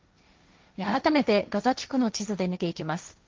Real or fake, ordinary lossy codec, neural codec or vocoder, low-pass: fake; Opus, 32 kbps; codec, 16 kHz, 1.1 kbps, Voila-Tokenizer; 7.2 kHz